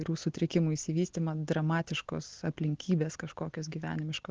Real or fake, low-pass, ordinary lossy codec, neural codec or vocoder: real; 7.2 kHz; Opus, 16 kbps; none